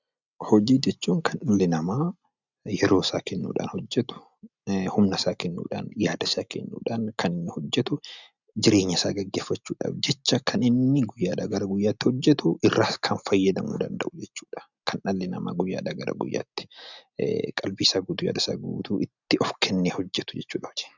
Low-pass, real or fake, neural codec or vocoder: 7.2 kHz; real; none